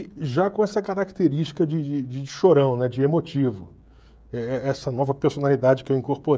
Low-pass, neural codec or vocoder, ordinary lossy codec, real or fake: none; codec, 16 kHz, 16 kbps, FreqCodec, smaller model; none; fake